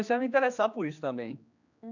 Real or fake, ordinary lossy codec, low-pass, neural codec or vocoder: fake; none; 7.2 kHz; codec, 16 kHz, 2 kbps, X-Codec, HuBERT features, trained on general audio